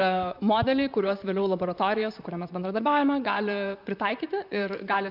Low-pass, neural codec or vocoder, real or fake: 5.4 kHz; vocoder, 44.1 kHz, 128 mel bands, Pupu-Vocoder; fake